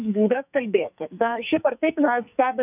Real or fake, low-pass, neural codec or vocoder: fake; 3.6 kHz; codec, 44.1 kHz, 2.6 kbps, SNAC